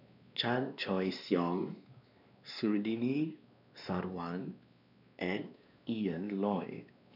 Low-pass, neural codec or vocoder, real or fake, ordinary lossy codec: 5.4 kHz; codec, 16 kHz, 2 kbps, X-Codec, WavLM features, trained on Multilingual LibriSpeech; fake; none